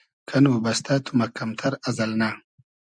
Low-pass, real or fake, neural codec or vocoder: 9.9 kHz; real; none